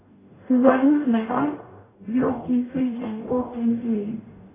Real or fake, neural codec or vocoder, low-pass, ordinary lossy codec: fake; codec, 44.1 kHz, 0.9 kbps, DAC; 3.6 kHz; AAC, 16 kbps